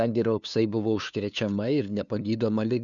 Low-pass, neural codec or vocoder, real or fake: 7.2 kHz; codec, 16 kHz, 2 kbps, FunCodec, trained on LibriTTS, 25 frames a second; fake